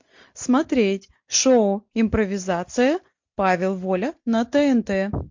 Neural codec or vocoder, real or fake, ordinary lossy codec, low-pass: none; real; MP3, 48 kbps; 7.2 kHz